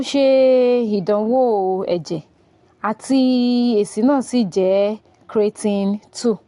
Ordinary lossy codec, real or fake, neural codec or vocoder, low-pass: AAC, 48 kbps; real; none; 10.8 kHz